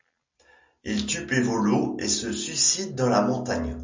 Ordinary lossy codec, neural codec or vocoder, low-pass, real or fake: MP3, 64 kbps; none; 7.2 kHz; real